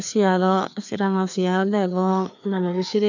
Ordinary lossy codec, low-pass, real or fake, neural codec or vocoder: none; 7.2 kHz; fake; codec, 16 kHz, 2 kbps, FreqCodec, larger model